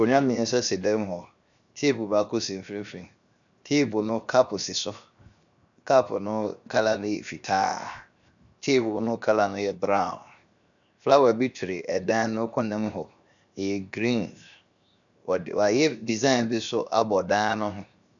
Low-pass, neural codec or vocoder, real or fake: 7.2 kHz; codec, 16 kHz, 0.7 kbps, FocalCodec; fake